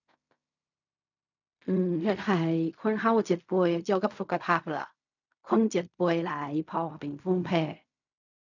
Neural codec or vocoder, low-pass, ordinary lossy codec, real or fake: codec, 16 kHz in and 24 kHz out, 0.4 kbps, LongCat-Audio-Codec, fine tuned four codebook decoder; 7.2 kHz; none; fake